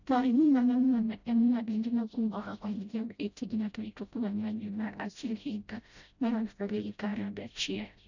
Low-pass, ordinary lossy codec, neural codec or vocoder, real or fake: 7.2 kHz; none; codec, 16 kHz, 0.5 kbps, FreqCodec, smaller model; fake